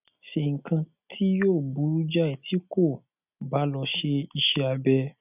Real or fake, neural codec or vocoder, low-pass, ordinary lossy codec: real; none; 3.6 kHz; none